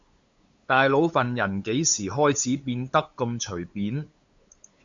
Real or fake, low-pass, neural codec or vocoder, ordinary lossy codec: fake; 7.2 kHz; codec, 16 kHz, 8 kbps, FunCodec, trained on LibriTTS, 25 frames a second; Opus, 64 kbps